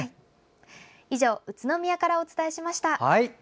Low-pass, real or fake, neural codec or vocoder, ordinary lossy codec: none; real; none; none